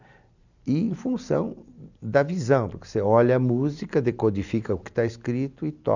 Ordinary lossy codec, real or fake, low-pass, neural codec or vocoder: none; real; 7.2 kHz; none